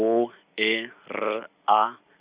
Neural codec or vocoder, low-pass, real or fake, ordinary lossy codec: none; 3.6 kHz; real; Opus, 64 kbps